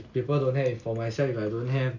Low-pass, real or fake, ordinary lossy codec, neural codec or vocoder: 7.2 kHz; real; none; none